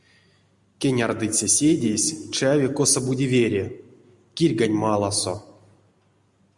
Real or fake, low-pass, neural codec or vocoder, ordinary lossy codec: real; 10.8 kHz; none; Opus, 64 kbps